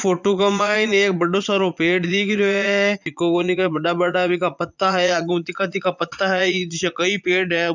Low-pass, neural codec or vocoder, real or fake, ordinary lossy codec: 7.2 kHz; vocoder, 22.05 kHz, 80 mel bands, Vocos; fake; none